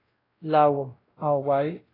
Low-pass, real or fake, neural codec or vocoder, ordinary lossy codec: 5.4 kHz; fake; codec, 16 kHz, 0.5 kbps, X-Codec, WavLM features, trained on Multilingual LibriSpeech; AAC, 24 kbps